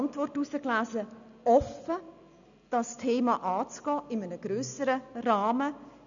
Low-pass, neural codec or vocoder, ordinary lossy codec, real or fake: 7.2 kHz; none; none; real